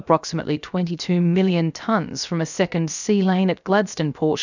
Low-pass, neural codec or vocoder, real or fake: 7.2 kHz; codec, 16 kHz, 0.7 kbps, FocalCodec; fake